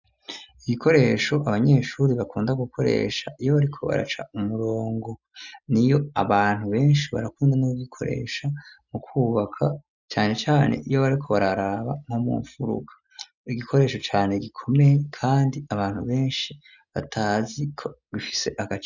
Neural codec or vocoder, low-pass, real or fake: none; 7.2 kHz; real